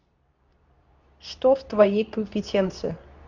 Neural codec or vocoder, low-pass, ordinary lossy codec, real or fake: codec, 24 kHz, 0.9 kbps, WavTokenizer, medium speech release version 2; 7.2 kHz; none; fake